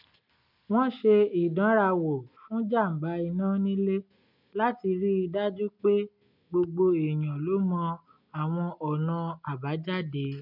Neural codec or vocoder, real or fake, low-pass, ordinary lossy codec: none; real; 5.4 kHz; none